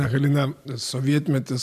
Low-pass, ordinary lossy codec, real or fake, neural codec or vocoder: 14.4 kHz; MP3, 96 kbps; fake; vocoder, 44.1 kHz, 128 mel bands every 512 samples, BigVGAN v2